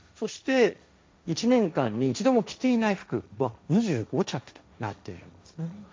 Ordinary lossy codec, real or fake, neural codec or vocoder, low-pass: none; fake; codec, 16 kHz, 1.1 kbps, Voila-Tokenizer; none